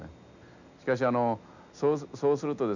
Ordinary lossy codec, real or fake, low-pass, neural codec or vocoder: none; real; 7.2 kHz; none